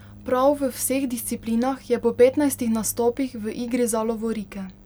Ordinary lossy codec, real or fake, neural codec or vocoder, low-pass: none; real; none; none